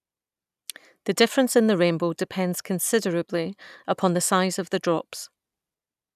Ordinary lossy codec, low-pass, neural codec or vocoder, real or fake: none; 14.4 kHz; none; real